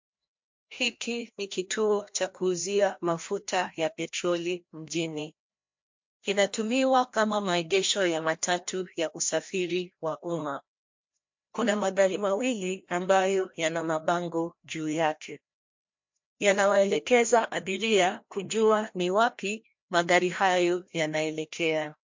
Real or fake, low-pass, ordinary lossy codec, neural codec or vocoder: fake; 7.2 kHz; MP3, 48 kbps; codec, 16 kHz, 1 kbps, FreqCodec, larger model